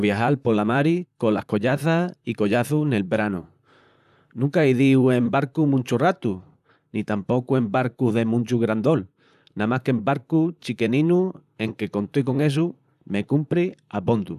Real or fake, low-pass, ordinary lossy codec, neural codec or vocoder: fake; 14.4 kHz; none; vocoder, 44.1 kHz, 128 mel bands, Pupu-Vocoder